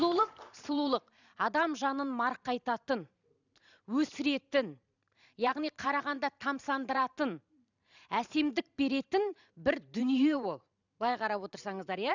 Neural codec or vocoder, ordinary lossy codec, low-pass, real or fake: vocoder, 44.1 kHz, 128 mel bands every 512 samples, BigVGAN v2; none; 7.2 kHz; fake